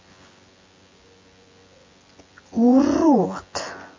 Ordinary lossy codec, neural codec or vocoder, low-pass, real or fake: MP3, 32 kbps; vocoder, 24 kHz, 100 mel bands, Vocos; 7.2 kHz; fake